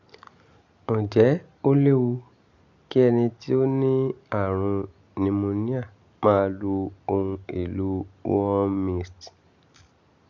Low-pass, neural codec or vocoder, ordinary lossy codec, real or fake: 7.2 kHz; none; none; real